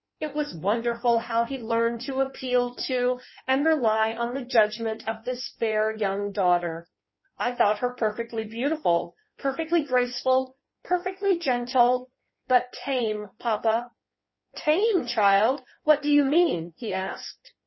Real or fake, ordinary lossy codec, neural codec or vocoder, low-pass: fake; MP3, 24 kbps; codec, 16 kHz in and 24 kHz out, 1.1 kbps, FireRedTTS-2 codec; 7.2 kHz